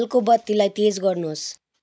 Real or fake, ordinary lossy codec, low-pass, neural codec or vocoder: real; none; none; none